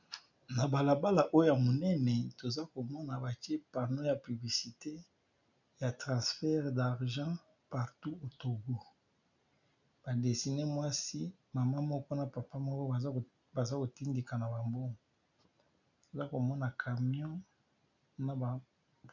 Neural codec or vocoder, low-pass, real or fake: none; 7.2 kHz; real